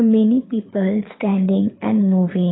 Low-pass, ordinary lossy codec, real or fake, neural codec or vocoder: 7.2 kHz; AAC, 16 kbps; fake; codec, 24 kHz, 6 kbps, HILCodec